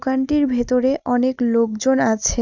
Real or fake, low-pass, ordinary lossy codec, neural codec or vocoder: real; 7.2 kHz; Opus, 64 kbps; none